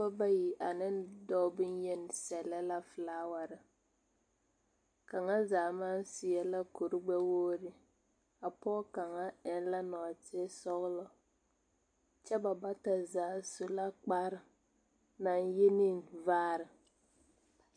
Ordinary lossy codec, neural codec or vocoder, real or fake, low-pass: MP3, 64 kbps; none; real; 9.9 kHz